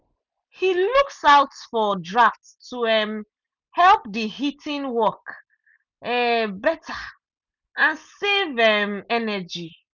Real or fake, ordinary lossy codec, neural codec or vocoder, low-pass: real; none; none; 7.2 kHz